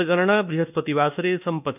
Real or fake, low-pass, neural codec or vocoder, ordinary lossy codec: fake; 3.6 kHz; codec, 24 kHz, 1.2 kbps, DualCodec; none